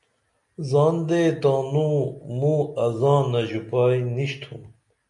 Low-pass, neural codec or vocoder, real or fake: 10.8 kHz; none; real